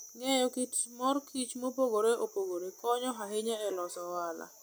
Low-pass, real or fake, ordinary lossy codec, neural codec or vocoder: none; real; none; none